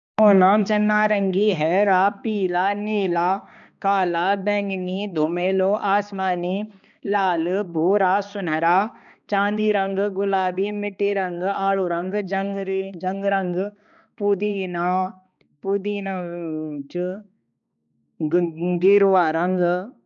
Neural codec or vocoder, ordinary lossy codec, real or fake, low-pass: codec, 16 kHz, 2 kbps, X-Codec, HuBERT features, trained on balanced general audio; none; fake; 7.2 kHz